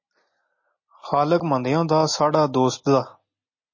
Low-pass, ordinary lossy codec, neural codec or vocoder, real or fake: 7.2 kHz; MP3, 32 kbps; none; real